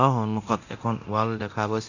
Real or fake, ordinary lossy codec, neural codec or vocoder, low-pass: fake; none; codec, 24 kHz, 0.9 kbps, DualCodec; 7.2 kHz